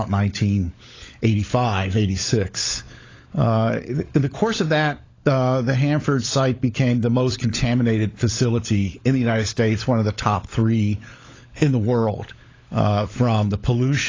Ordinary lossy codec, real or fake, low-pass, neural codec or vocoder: AAC, 32 kbps; fake; 7.2 kHz; codec, 16 kHz, 16 kbps, FunCodec, trained on LibriTTS, 50 frames a second